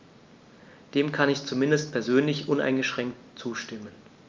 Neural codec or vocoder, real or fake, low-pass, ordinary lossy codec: none; real; 7.2 kHz; Opus, 24 kbps